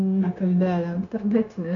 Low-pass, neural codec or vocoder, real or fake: 7.2 kHz; codec, 16 kHz, 0.9 kbps, LongCat-Audio-Codec; fake